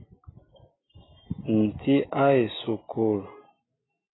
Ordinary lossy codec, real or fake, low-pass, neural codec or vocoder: AAC, 16 kbps; real; 7.2 kHz; none